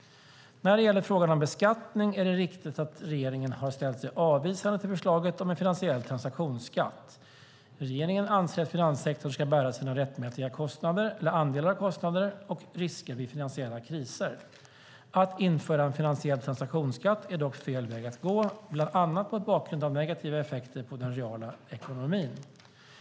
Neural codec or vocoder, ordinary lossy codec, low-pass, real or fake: none; none; none; real